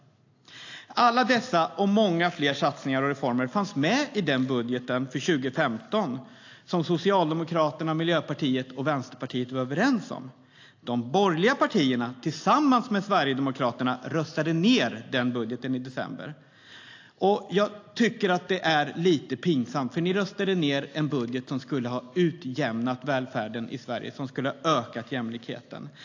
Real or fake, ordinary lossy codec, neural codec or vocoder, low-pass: real; AAC, 48 kbps; none; 7.2 kHz